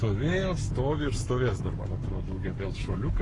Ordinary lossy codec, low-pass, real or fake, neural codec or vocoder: AAC, 32 kbps; 10.8 kHz; fake; codec, 44.1 kHz, 7.8 kbps, Pupu-Codec